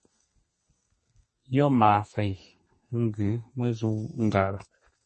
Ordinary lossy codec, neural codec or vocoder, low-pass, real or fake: MP3, 32 kbps; codec, 32 kHz, 1.9 kbps, SNAC; 10.8 kHz; fake